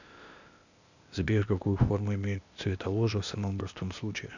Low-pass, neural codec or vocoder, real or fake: 7.2 kHz; codec, 16 kHz, 0.8 kbps, ZipCodec; fake